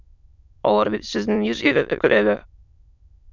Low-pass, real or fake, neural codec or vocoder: 7.2 kHz; fake; autoencoder, 22.05 kHz, a latent of 192 numbers a frame, VITS, trained on many speakers